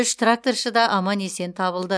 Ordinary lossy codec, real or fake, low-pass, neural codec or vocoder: none; real; none; none